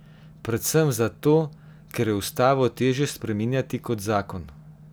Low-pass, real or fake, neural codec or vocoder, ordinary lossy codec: none; real; none; none